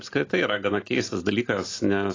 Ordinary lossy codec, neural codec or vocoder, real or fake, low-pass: AAC, 32 kbps; none; real; 7.2 kHz